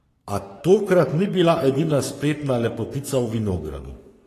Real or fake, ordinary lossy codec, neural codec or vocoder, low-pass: fake; AAC, 48 kbps; codec, 44.1 kHz, 3.4 kbps, Pupu-Codec; 14.4 kHz